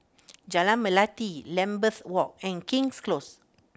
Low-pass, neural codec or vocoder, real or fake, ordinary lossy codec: none; none; real; none